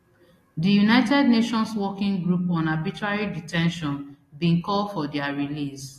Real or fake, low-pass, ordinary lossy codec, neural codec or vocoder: real; 14.4 kHz; AAC, 48 kbps; none